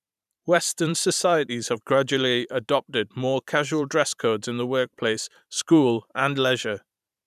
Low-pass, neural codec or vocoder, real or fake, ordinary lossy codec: 14.4 kHz; vocoder, 44.1 kHz, 128 mel bands every 512 samples, BigVGAN v2; fake; none